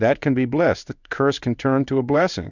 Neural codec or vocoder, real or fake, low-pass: codec, 16 kHz in and 24 kHz out, 1 kbps, XY-Tokenizer; fake; 7.2 kHz